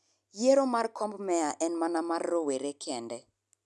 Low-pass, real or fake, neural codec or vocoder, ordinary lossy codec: none; real; none; none